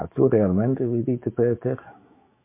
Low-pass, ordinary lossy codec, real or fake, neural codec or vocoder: 3.6 kHz; MP3, 24 kbps; fake; autoencoder, 48 kHz, 128 numbers a frame, DAC-VAE, trained on Japanese speech